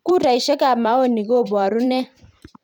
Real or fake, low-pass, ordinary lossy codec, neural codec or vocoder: fake; 19.8 kHz; none; vocoder, 44.1 kHz, 128 mel bands every 512 samples, BigVGAN v2